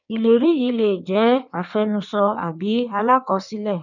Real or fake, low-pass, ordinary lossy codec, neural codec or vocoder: fake; 7.2 kHz; none; codec, 16 kHz in and 24 kHz out, 1.1 kbps, FireRedTTS-2 codec